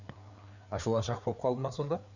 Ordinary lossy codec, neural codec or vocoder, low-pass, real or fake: none; codec, 16 kHz, 4 kbps, FreqCodec, larger model; 7.2 kHz; fake